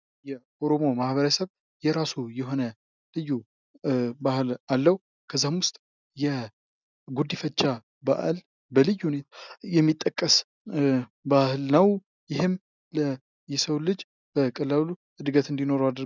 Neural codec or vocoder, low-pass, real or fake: none; 7.2 kHz; real